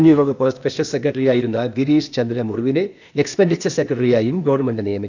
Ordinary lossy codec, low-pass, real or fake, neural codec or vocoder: none; 7.2 kHz; fake; codec, 16 kHz, 0.8 kbps, ZipCodec